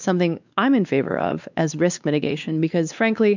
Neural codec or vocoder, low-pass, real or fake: codec, 16 kHz, 2 kbps, X-Codec, WavLM features, trained on Multilingual LibriSpeech; 7.2 kHz; fake